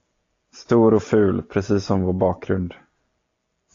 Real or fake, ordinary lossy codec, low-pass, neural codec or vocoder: real; AAC, 48 kbps; 7.2 kHz; none